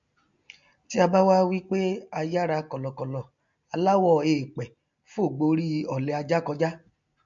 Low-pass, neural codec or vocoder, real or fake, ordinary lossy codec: 7.2 kHz; none; real; MP3, 48 kbps